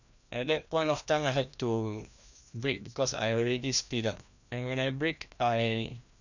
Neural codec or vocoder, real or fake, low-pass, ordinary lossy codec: codec, 16 kHz, 1 kbps, FreqCodec, larger model; fake; 7.2 kHz; none